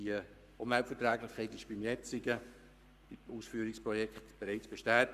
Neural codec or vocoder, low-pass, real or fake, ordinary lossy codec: codec, 44.1 kHz, 7.8 kbps, Pupu-Codec; 14.4 kHz; fake; Opus, 64 kbps